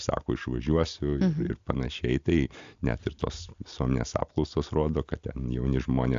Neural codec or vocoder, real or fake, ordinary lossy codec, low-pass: none; real; AAC, 64 kbps; 7.2 kHz